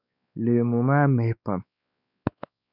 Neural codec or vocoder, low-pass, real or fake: codec, 16 kHz, 4 kbps, X-Codec, WavLM features, trained on Multilingual LibriSpeech; 5.4 kHz; fake